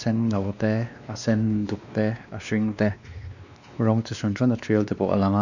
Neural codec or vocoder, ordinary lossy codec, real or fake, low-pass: codec, 16 kHz, 2 kbps, X-Codec, WavLM features, trained on Multilingual LibriSpeech; none; fake; 7.2 kHz